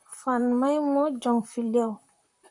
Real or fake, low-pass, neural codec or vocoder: fake; 10.8 kHz; vocoder, 44.1 kHz, 128 mel bands, Pupu-Vocoder